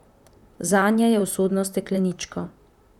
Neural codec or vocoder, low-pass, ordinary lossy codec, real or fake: vocoder, 44.1 kHz, 128 mel bands, Pupu-Vocoder; 19.8 kHz; none; fake